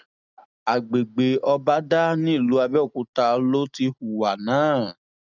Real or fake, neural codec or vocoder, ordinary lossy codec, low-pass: real; none; none; 7.2 kHz